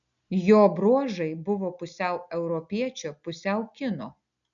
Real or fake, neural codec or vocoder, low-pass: real; none; 7.2 kHz